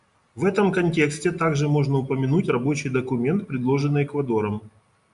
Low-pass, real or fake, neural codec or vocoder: 10.8 kHz; real; none